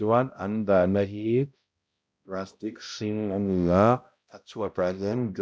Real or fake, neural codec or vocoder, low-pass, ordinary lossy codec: fake; codec, 16 kHz, 0.5 kbps, X-Codec, HuBERT features, trained on balanced general audio; none; none